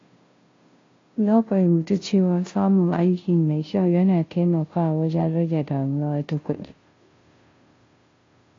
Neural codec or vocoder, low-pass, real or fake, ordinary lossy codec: codec, 16 kHz, 0.5 kbps, FunCodec, trained on Chinese and English, 25 frames a second; 7.2 kHz; fake; AAC, 32 kbps